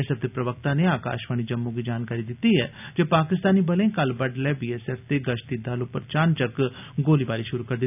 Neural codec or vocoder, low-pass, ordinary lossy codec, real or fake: none; 3.6 kHz; none; real